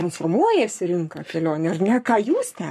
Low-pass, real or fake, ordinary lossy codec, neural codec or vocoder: 14.4 kHz; fake; MP3, 64 kbps; codec, 44.1 kHz, 7.8 kbps, Pupu-Codec